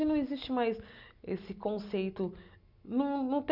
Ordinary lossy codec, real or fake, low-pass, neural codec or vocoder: none; real; 5.4 kHz; none